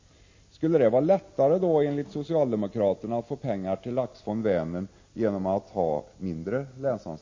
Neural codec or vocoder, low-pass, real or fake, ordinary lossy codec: none; 7.2 kHz; real; MP3, 32 kbps